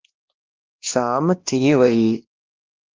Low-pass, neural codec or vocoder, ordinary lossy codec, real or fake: 7.2 kHz; codec, 16 kHz, 1 kbps, X-Codec, WavLM features, trained on Multilingual LibriSpeech; Opus, 16 kbps; fake